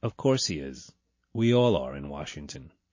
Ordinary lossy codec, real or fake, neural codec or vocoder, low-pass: MP3, 32 kbps; real; none; 7.2 kHz